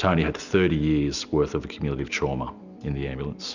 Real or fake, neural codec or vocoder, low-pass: real; none; 7.2 kHz